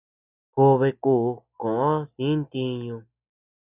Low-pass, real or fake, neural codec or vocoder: 3.6 kHz; real; none